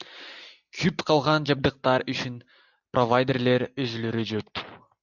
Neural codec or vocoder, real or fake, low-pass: none; real; 7.2 kHz